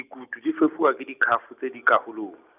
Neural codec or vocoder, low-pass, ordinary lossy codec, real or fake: none; 3.6 kHz; none; real